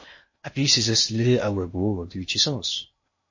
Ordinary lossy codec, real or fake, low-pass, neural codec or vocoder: MP3, 32 kbps; fake; 7.2 kHz; codec, 16 kHz in and 24 kHz out, 0.8 kbps, FocalCodec, streaming, 65536 codes